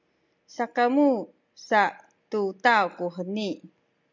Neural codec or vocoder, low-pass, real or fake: none; 7.2 kHz; real